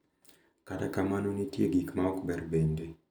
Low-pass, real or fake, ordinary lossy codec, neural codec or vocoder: none; real; none; none